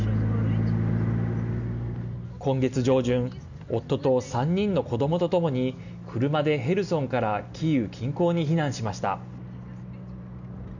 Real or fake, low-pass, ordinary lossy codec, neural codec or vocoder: real; 7.2 kHz; none; none